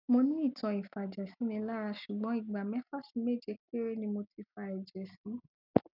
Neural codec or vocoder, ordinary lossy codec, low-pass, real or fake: none; none; 5.4 kHz; real